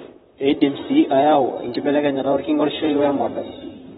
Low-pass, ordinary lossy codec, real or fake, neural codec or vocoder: 19.8 kHz; AAC, 16 kbps; fake; vocoder, 44.1 kHz, 128 mel bands, Pupu-Vocoder